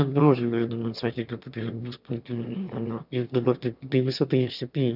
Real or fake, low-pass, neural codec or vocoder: fake; 5.4 kHz; autoencoder, 22.05 kHz, a latent of 192 numbers a frame, VITS, trained on one speaker